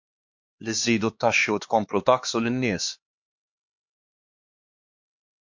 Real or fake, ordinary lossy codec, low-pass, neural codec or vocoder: fake; MP3, 48 kbps; 7.2 kHz; codec, 16 kHz, 2 kbps, X-Codec, HuBERT features, trained on LibriSpeech